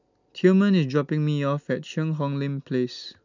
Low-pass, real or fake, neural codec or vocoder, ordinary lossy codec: 7.2 kHz; real; none; none